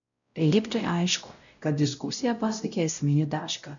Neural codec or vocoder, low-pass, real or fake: codec, 16 kHz, 0.5 kbps, X-Codec, WavLM features, trained on Multilingual LibriSpeech; 7.2 kHz; fake